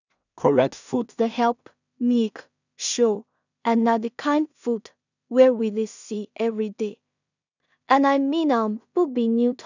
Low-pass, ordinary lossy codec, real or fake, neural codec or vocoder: 7.2 kHz; none; fake; codec, 16 kHz in and 24 kHz out, 0.4 kbps, LongCat-Audio-Codec, two codebook decoder